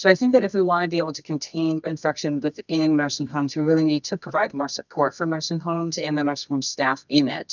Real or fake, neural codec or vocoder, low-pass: fake; codec, 24 kHz, 0.9 kbps, WavTokenizer, medium music audio release; 7.2 kHz